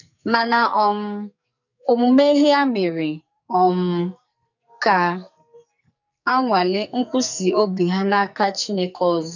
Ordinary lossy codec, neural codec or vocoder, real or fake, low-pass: none; codec, 44.1 kHz, 2.6 kbps, SNAC; fake; 7.2 kHz